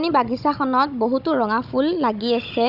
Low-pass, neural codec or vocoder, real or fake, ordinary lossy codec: 5.4 kHz; codec, 16 kHz, 16 kbps, FunCodec, trained on Chinese and English, 50 frames a second; fake; Opus, 64 kbps